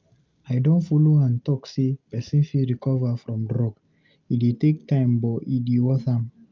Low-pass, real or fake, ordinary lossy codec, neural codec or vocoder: 7.2 kHz; real; Opus, 24 kbps; none